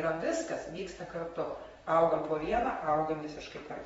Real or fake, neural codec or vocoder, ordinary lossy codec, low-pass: fake; vocoder, 44.1 kHz, 128 mel bands, Pupu-Vocoder; AAC, 24 kbps; 19.8 kHz